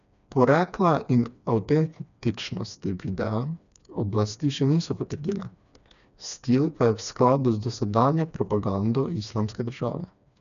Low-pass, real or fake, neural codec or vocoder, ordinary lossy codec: 7.2 kHz; fake; codec, 16 kHz, 2 kbps, FreqCodec, smaller model; none